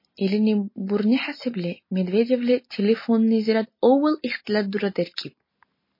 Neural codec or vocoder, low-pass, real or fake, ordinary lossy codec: none; 5.4 kHz; real; MP3, 24 kbps